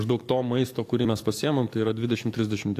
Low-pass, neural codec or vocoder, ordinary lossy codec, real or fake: 14.4 kHz; codec, 44.1 kHz, 7.8 kbps, DAC; AAC, 64 kbps; fake